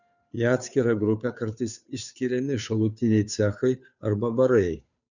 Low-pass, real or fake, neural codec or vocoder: 7.2 kHz; fake; codec, 16 kHz, 2 kbps, FunCodec, trained on Chinese and English, 25 frames a second